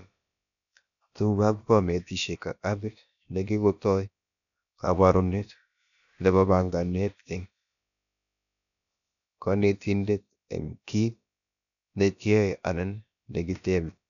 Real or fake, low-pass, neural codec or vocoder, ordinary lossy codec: fake; 7.2 kHz; codec, 16 kHz, about 1 kbps, DyCAST, with the encoder's durations; none